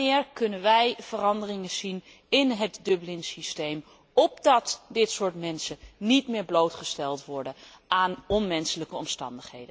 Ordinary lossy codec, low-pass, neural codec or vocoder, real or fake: none; none; none; real